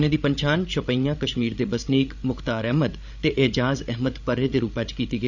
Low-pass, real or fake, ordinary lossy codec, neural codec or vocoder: 7.2 kHz; fake; none; codec, 16 kHz, 16 kbps, FreqCodec, larger model